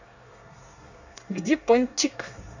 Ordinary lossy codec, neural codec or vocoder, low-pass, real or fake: none; codec, 24 kHz, 1 kbps, SNAC; 7.2 kHz; fake